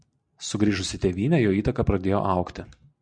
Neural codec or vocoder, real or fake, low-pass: none; real; 9.9 kHz